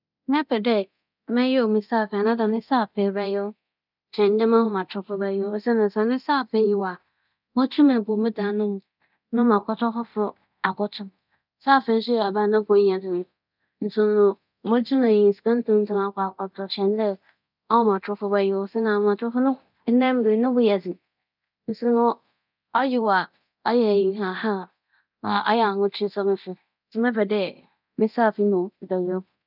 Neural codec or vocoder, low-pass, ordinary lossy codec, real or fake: codec, 24 kHz, 0.9 kbps, DualCodec; 5.4 kHz; none; fake